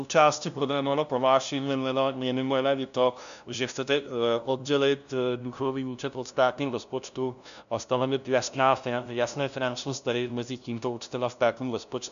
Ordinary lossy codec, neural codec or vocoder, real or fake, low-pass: MP3, 96 kbps; codec, 16 kHz, 0.5 kbps, FunCodec, trained on LibriTTS, 25 frames a second; fake; 7.2 kHz